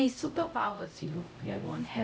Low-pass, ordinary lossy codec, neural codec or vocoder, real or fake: none; none; codec, 16 kHz, 0.5 kbps, X-Codec, HuBERT features, trained on LibriSpeech; fake